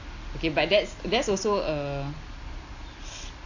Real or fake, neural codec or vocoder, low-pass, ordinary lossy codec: real; none; 7.2 kHz; AAC, 48 kbps